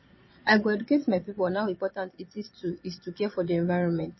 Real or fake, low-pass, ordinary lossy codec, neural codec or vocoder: fake; 7.2 kHz; MP3, 24 kbps; vocoder, 22.05 kHz, 80 mel bands, Vocos